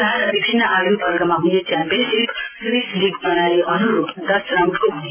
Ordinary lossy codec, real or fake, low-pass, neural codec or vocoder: AAC, 24 kbps; real; 3.6 kHz; none